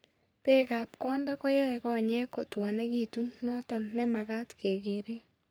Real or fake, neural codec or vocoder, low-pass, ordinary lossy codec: fake; codec, 44.1 kHz, 3.4 kbps, Pupu-Codec; none; none